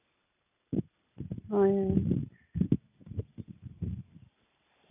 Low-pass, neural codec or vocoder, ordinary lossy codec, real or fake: 3.6 kHz; none; none; real